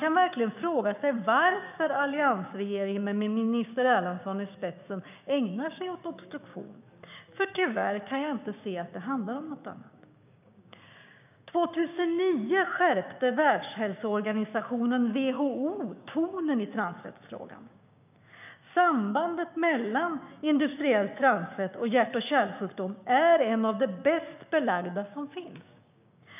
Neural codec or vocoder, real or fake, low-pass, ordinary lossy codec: codec, 16 kHz, 6 kbps, DAC; fake; 3.6 kHz; none